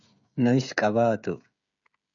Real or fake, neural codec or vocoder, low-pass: fake; codec, 16 kHz, 16 kbps, FreqCodec, smaller model; 7.2 kHz